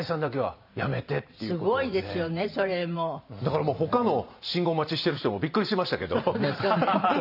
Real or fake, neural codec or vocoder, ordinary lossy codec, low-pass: fake; vocoder, 44.1 kHz, 128 mel bands every 512 samples, BigVGAN v2; none; 5.4 kHz